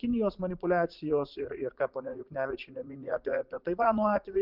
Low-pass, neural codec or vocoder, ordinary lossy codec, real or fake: 5.4 kHz; vocoder, 44.1 kHz, 80 mel bands, Vocos; Opus, 24 kbps; fake